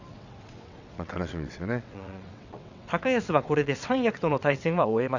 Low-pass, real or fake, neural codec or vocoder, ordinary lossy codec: 7.2 kHz; fake; vocoder, 22.05 kHz, 80 mel bands, WaveNeXt; none